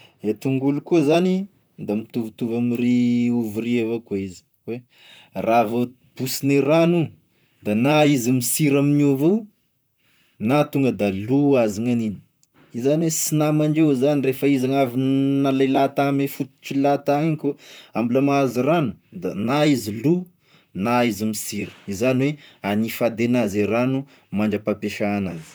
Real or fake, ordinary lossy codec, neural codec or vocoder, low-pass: fake; none; vocoder, 44.1 kHz, 128 mel bands, Pupu-Vocoder; none